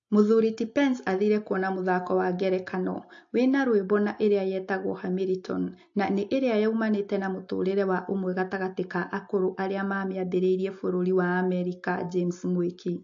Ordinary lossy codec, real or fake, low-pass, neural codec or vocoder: AAC, 48 kbps; real; 7.2 kHz; none